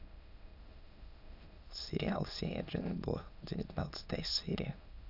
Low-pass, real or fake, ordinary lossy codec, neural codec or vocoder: 5.4 kHz; fake; none; autoencoder, 22.05 kHz, a latent of 192 numbers a frame, VITS, trained on many speakers